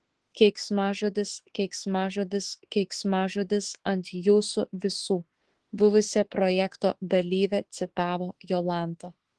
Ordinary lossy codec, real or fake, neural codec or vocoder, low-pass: Opus, 16 kbps; fake; autoencoder, 48 kHz, 32 numbers a frame, DAC-VAE, trained on Japanese speech; 10.8 kHz